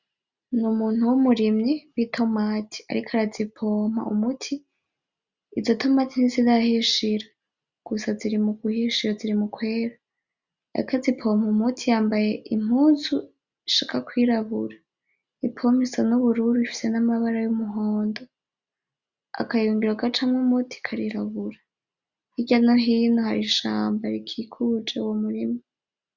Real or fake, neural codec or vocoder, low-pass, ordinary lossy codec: real; none; 7.2 kHz; Opus, 64 kbps